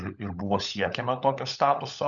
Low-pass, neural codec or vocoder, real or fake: 7.2 kHz; codec, 16 kHz, 16 kbps, FunCodec, trained on LibriTTS, 50 frames a second; fake